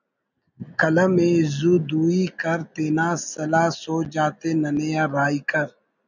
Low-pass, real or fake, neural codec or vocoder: 7.2 kHz; real; none